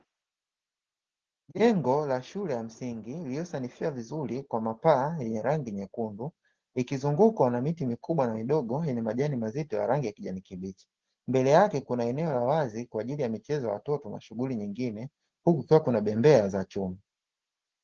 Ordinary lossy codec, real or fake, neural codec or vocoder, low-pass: Opus, 16 kbps; real; none; 7.2 kHz